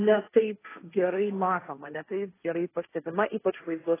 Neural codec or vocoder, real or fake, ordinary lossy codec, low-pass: codec, 16 kHz, 1.1 kbps, Voila-Tokenizer; fake; AAC, 16 kbps; 3.6 kHz